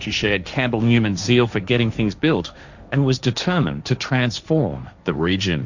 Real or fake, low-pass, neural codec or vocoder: fake; 7.2 kHz; codec, 16 kHz, 1.1 kbps, Voila-Tokenizer